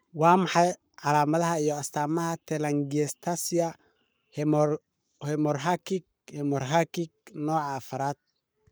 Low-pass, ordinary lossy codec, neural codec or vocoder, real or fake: none; none; codec, 44.1 kHz, 7.8 kbps, Pupu-Codec; fake